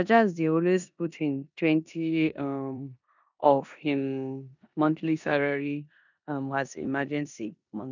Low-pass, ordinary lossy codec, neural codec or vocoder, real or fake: 7.2 kHz; none; codec, 16 kHz in and 24 kHz out, 0.9 kbps, LongCat-Audio-Codec, four codebook decoder; fake